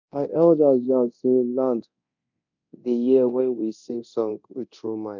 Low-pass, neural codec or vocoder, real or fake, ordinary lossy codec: 7.2 kHz; codec, 24 kHz, 0.9 kbps, DualCodec; fake; none